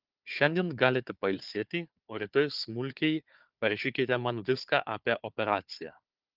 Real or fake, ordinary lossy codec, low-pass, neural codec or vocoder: fake; Opus, 24 kbps; 5.4 kHz; codec, 16 kHz, 4 kbps, FunCodec, trained on Chinese and English, 50 frames a second